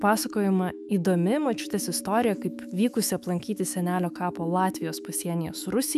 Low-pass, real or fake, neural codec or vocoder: 14.4 kHz; fake; autoencoder, 48 kHz, 128 numbers a frame, DAC-VAE, trained on Japanese speech